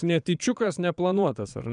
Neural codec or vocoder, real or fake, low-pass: vocoder, 22.05 kHz, 80 mel bands, Vocos; fake; 9.9 kHz